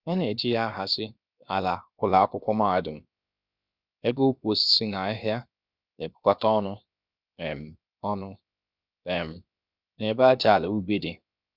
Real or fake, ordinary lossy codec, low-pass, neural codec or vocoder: fake; Opus, 64 kbps; 5.4 kHz; codec, 16 kHz, 0.7 kbps, FocalCodec